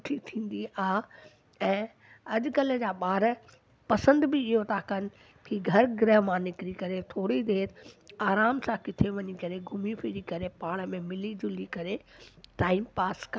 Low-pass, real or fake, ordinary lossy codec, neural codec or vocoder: none; real; none; none